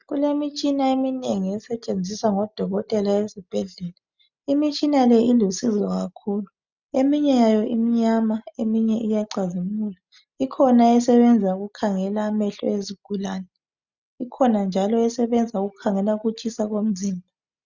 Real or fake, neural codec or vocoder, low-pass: real; none; 7.2 kHz